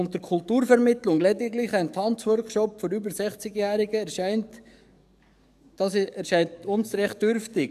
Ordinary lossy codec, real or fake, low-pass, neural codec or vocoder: none; fake; 14.4 kHz; codec, 44.1 kHz, 7.8 kbps, DAC